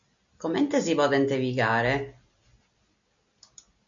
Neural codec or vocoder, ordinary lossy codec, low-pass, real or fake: none; MP3, 64 kbps; 7.2 kHz; real